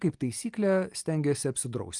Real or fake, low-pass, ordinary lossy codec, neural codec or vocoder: real; 10.8 kHz; Opus, 24 kbps; none